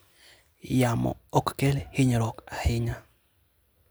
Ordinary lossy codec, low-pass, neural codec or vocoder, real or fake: none; none; none; real